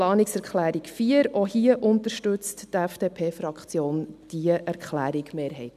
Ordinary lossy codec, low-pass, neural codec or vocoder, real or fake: none; 14.4 kHz; none; real